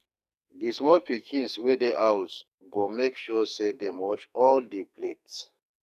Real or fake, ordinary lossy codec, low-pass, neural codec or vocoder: fake; none; 14.4 kHz; codec, 44.1 kHz, 2.6 kbps, SNAC